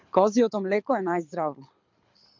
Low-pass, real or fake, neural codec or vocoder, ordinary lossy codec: 7.2 kHz; fake; codec, 24 kHz, 6 kbps, HILCodec; MP3, 64 kbps